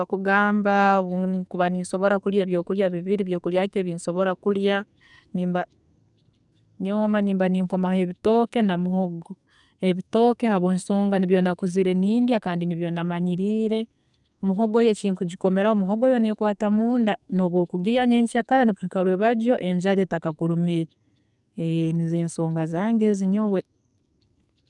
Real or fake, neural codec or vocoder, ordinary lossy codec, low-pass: fake; codec, 44.1 kHz, 2.6 kbps, SNAC; none; 10.8 kHz